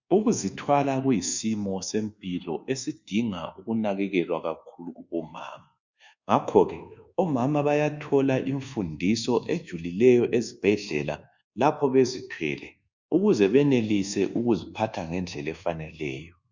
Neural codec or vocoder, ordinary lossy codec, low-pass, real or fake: codec, 24 kHz, 1.2 kbps, DualCodec; Opus, 64 kbps; 7.2 kHz; fake